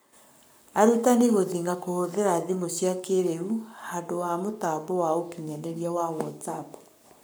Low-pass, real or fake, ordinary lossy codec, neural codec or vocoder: none; fake; none; codec, 44.1 kHz, 7.8 kbps, Pupu-Codec